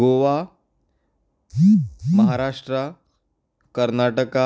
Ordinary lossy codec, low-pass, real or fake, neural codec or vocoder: none; none; real; none